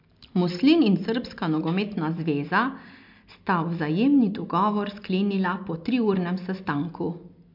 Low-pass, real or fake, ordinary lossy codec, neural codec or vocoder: 5.4 kHz; real; MP3, 48 kbps; none